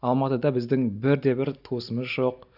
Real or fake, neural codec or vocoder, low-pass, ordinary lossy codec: real; none; 5.4 kHz; none